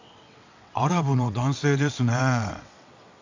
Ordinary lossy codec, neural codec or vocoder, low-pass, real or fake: AAC, 48 kbps; vocoder, 22.05 kHz, 80 mel bands, WaveNeXt; 7.2 kHz; fake